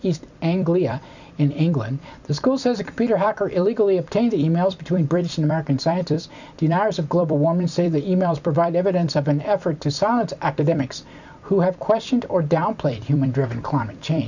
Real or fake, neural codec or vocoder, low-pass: fake; vocoder, 44.1 kHz, 128 mel bands every 256 samples, BigVGAN v2; 7.2 kHz